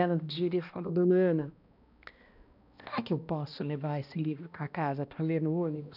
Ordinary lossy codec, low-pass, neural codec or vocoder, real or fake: none; 5.4 kHz; codec, 16 kHz, 1 kbps, X-Codec, HuBERT features, trained on balanced general audio; fake